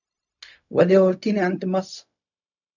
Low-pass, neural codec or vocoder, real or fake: 7.2 kHz; codec, 16 kHz, 0.4 kbps, LongCat-Audio-Codec; fake